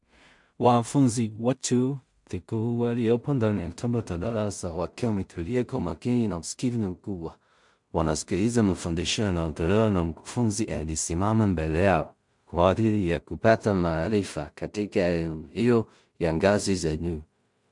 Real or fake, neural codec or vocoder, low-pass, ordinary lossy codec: fake; codec, 16 kHz in and 24 kHz out, 0.4 kbps, LongCat-Audio-Codec, two codebook decoder; 10.8 kHz; MP3, 64 kbps